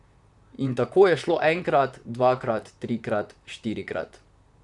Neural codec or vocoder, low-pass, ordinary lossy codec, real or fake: vocoder, 44.1 kHz, 128 mel bands, Pupu-Vocoder; 10.8 kHz; none; fake